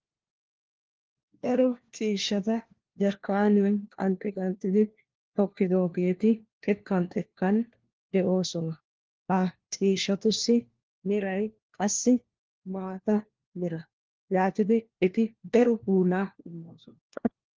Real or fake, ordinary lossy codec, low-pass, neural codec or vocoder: fake; Opus, 16 kbps; 7.2 kHz; codec, 16 kHz, 1 kbps, FunCodec, trained on LibriTTS, 50 frames a second